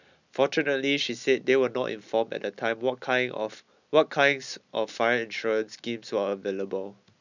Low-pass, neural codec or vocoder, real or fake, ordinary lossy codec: 7.2 kHz; none; real; none